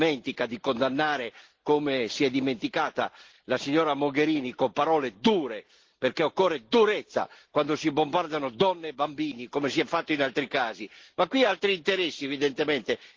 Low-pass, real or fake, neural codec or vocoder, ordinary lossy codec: 7.2 kHz; real; none; Opus, 16 kbps